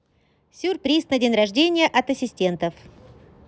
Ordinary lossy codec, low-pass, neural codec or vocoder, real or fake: none; none; none; real